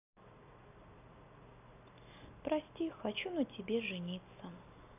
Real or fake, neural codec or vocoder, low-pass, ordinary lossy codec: real; none; 3.6 kHz; none